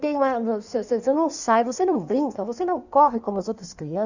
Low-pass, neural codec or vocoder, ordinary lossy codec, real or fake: 7.2 kHz; codec, 16 kHz in and 24 kHz out, 1.1 kbps, FireRedTTS-2 codec; none; fake